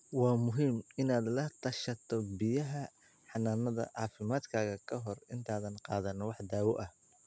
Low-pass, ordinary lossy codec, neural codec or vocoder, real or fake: none; none; none; real